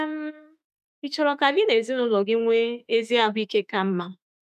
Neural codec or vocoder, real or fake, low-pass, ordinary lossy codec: autoencoder, 48 kHz, 32 numbers a frame, DAC-VAE, trained on Japanese speech; fake; 14.4 kHz; none